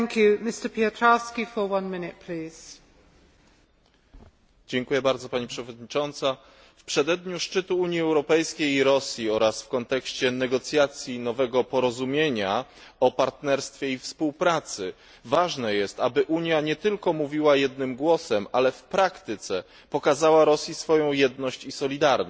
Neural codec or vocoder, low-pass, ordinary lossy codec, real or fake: none; none; none; real